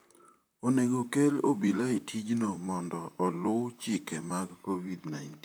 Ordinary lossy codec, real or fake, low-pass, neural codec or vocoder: none; fake; none; vocoder, 44.1 kHz, 128 mel bands, Pupu-Vocoder